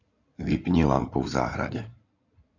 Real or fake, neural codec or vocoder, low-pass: fake; codec, 16 kHz in and 24 kHz out, 2.2 kbps, FireRedTTS-2 codec; 7.2 kHz